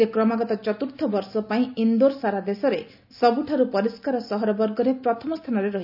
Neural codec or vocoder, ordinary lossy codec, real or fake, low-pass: none; none; real; 5.4 kHz